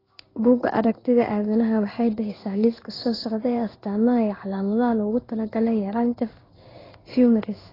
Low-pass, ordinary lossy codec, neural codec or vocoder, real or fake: 5.4 kHz; AAC, 24 kbps; codec, 16 kHz in and 24 kHz out, 1 kbps, XY-Tokenizer; fake